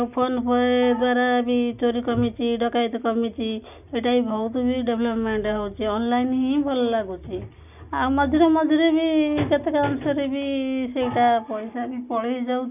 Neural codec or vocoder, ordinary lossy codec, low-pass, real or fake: none; none; 3.6 kHz; real